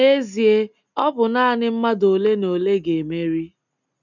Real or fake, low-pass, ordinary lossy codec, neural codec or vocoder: real; 7.2 kHz; none; none